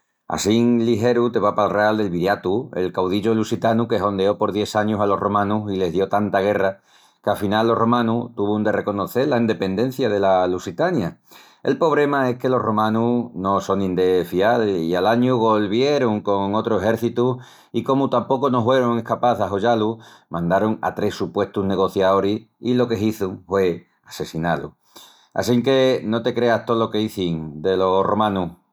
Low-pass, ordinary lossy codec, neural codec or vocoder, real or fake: 19.8 kHz; none; none; real